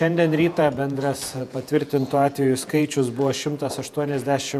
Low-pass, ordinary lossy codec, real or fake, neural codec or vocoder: 14.4 kHz; MP3, 96 kbps; fake; vocoder, 48 kHz, 128 mel bands, Vocos